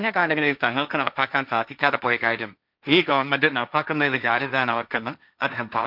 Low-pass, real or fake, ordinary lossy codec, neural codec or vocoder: 5.4 kHz; fake; none; codec, 16 kHz, 1.1 kbps, Voila-Tokenizer